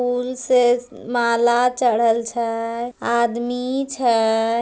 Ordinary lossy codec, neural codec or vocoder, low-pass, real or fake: none; none; none; real